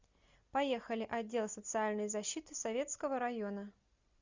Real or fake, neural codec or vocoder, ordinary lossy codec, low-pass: real; none; Opus, 64 kbps; 7.2 kHz